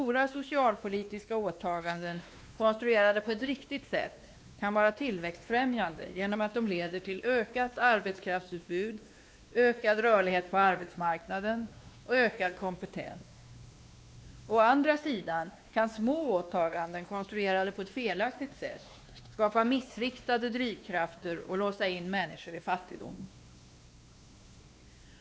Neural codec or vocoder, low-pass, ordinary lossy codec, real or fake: codec, 16 kHz, 2 kbps, X-Codec, WavLM features, trained on Multilingual LibriSpeech; none; none; fake